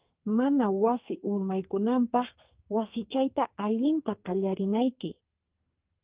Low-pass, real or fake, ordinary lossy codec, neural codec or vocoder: 3.6 kHz; fake; Opus, 32 kbps; codec, 44.1 kHz, 2.6 kbps, SNAC